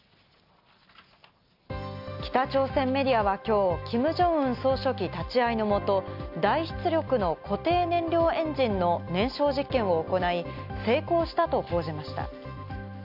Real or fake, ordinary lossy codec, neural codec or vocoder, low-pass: real; none; none; 5.4 kHz